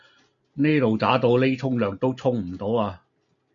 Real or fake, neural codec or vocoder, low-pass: real; none; 7.2 kHz